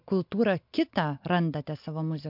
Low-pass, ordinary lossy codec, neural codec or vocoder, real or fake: 5.4 kHz; MP3, 48 kbps; none; real